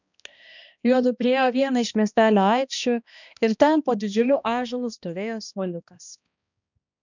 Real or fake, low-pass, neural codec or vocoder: fake; 7.2 kHz; codec, 16 kHz, 1 kbps, X-Codec, HuBERT features, trained on balanced general audio